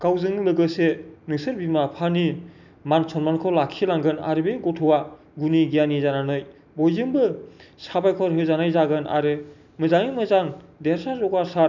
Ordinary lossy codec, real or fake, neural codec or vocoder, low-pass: none; real; none; 7.2 kHz